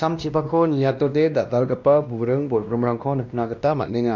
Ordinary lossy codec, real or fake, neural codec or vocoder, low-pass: none; fake; codec, 16 kHz, 1 kbps, X-Codec, WavLM features, trained on Multilingual LibriSpeech; 7.2 kHz